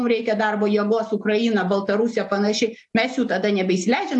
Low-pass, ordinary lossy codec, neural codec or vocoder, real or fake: 10.8 kHz; AAC, 64 kbps; none; real